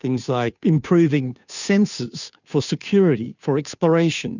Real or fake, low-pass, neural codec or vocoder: fake; 7.2 kHz; codec, 16 kHz, 2 kbps, FunCodec, trained on Chinese and English, 25 frames a second